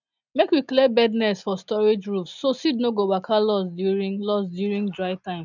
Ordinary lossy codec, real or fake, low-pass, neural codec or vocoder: none; real; 7.2 kHz; none